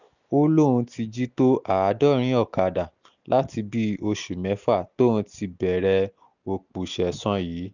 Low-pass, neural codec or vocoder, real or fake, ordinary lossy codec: 7.2 kHz; none; real; none